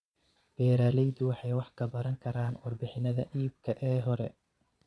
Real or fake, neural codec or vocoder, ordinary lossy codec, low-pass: fake; vocoder, 22.05 kHz, 80 mel bands, Vocos; none; none